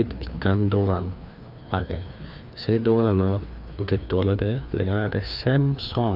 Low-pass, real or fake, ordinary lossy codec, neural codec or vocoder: 5.4 kHz; fake; none; codec, 16 kHz, 2 kbps, FreqCodec, larger model